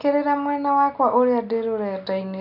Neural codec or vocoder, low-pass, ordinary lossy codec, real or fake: none; 5.4 kHz; none; real